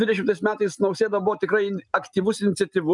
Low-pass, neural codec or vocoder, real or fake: 10.8 kHz; none; real